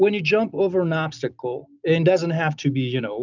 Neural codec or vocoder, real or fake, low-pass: none; real; 7.2 kHz